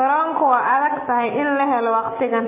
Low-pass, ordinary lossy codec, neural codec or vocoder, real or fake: 3.6 kHz; MP3, 16 kbps; none; real